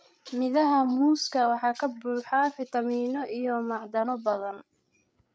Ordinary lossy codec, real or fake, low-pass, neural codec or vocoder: none; fake; none; codec, 16 kHz, 8 kbps, FreqCodec, larger model